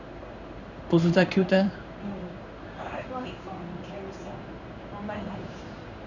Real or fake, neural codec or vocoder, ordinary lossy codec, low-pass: fake; codec, 16 kHz in and 24 kHz out, 1 kbps, XY-Tokenizer; none; 7.2 kHz